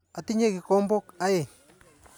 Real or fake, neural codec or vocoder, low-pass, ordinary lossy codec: real; none; none; none